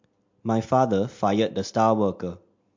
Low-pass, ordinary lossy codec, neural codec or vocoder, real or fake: 7.2 kHz; MP3, 48 kbps; none; real